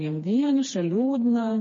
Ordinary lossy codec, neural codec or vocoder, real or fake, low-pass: MP3, 32 kbps; codec, 16 kHz, 2 kbps, FreqCodec, smaller model; fake; 7.2 kHz